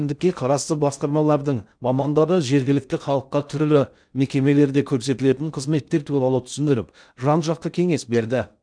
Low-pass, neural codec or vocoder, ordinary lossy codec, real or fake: 9.9 kHz; codec, 16 kHz in and 24 kHz out, 0.6 kbps, FocalCodec, streaming, 2048 codes; none; fake